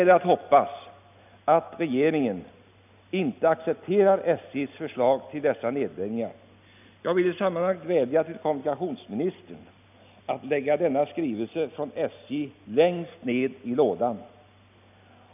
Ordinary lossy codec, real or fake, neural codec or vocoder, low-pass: none; real; none; 3.6 kHz